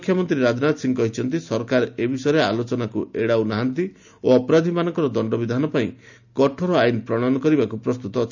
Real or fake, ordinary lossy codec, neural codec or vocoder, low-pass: real; none; none; 7.2 kHz